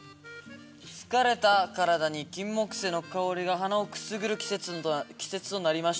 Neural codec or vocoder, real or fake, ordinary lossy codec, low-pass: none; real; none; none